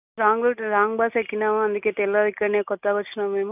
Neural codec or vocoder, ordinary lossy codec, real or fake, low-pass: none; none; real; 3.6 kHz